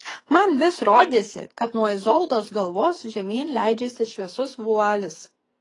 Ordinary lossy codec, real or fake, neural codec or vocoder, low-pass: AAC, 32 kbps; fake; codec, 44.1 kHz, 2.6 kbps, SNAC; 10.8 kHz